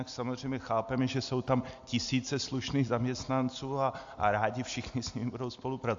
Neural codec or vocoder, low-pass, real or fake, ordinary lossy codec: none; 7.2 kHz; real; AAC, 64 kbps